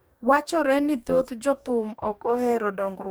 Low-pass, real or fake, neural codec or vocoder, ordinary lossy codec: none; fake; codec, 44.1 kHz, 2.6 kbps, DAC; none